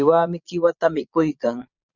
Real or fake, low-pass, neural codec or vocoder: fake; 7.2 kHz; vocoder, 22.05 kHz, 80 mel bands, Vocos